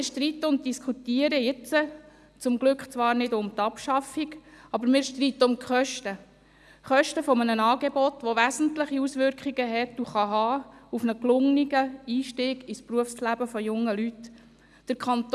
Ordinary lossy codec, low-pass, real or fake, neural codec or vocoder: none; none; real; none